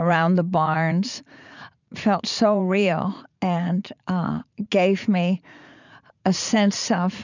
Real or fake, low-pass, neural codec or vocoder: fake; 7.2 kHz; vocoder, 44.1 kHz, 80 mel bands, Vocos